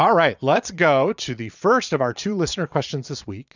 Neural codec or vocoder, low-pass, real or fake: vocoder, 22.05 kHz, 80 mel bands, Vocos; 7.2 kHz; fake